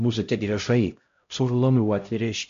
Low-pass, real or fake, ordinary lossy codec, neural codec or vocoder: 7.2 kHz; fake; MP3, 48 kbps; codec, 16 kHz, 0.5 kbps, X-Codec, HuBERT features, trained on LibriSpeech